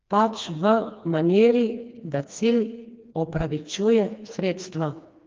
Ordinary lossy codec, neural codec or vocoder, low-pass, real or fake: Opus, 24 kbps; codec, 16 kHz, 2 kbps, FreqCodec, smaller model; 7.2 kHz; fake